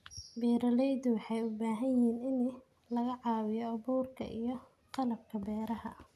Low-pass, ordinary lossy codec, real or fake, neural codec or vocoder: 14.4 kHz; none; real; none